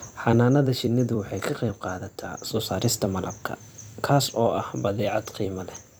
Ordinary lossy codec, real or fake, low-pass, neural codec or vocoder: none; fake; none; vocoder, 44.1 kHz, 128 mel bands every 256 samples, BigVGAN v2